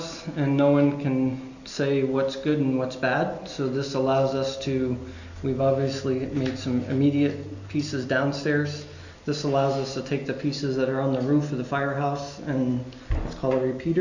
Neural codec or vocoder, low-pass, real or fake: none; 7.2 kHz; real